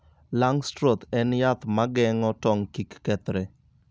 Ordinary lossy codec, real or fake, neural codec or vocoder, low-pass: none; real; none; none